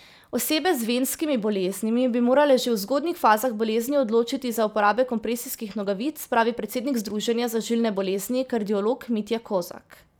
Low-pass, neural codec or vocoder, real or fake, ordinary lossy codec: none; vocoder, 44.1 kHz, 128 mel bands every 512 samples, BigVGAN v2; fake; none